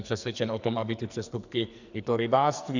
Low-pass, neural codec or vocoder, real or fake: 7.2 kHz; codec, 44.1 kHz, 2.6 kbps, SNAC; fake